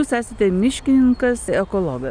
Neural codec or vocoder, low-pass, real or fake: none; 9.9 kHz; real